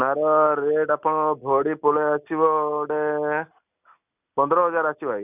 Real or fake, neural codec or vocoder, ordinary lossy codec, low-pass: real; none; none; 3.6 kHz